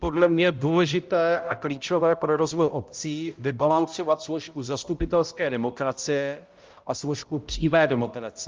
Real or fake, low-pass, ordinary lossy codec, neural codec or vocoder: fake; 7.2 kHz; Opus, 32 kbps; codec, 16 kHz, 0.5 kbps, X-Codec, HuBERT features, trained on balanced general audio